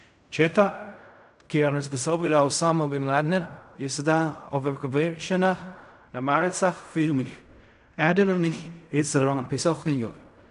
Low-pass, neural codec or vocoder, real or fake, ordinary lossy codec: 10.8 kHz; codec, 16 kHz in and 24 kHz out, 0.4 kbps, LongCat-Audio-Codec, fine tuned four codebook decoder; fake; none